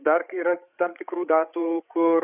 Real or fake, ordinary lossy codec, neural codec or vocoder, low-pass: fake; Opus, 64 kbps; codec, 16 kHz, 8 kbps, FreqCodec, larger model; 3.6 kHz